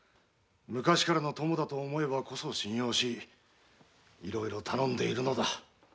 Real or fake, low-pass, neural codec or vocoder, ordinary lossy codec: real; none; none; none